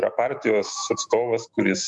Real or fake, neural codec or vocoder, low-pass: fake; autoencoder, 48 kHz, 128 numbers a frame, DAC-VAE, trained on Japanese speech; 10.8 kHz